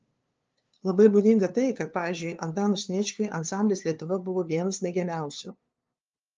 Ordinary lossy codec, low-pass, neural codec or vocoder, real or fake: Opus, 24 kbps; 7.2 kHz; codec, 16 kHz, 2 kbps, FunCodec, trained on LibriTTS, 25 frames a second; fake